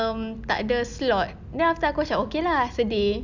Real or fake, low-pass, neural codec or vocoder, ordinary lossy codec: real; 7.2 kHz; none; none